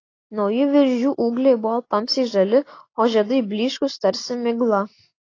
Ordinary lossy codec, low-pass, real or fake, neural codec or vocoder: AAC, 32 kbps; 7.2 kHz; real; none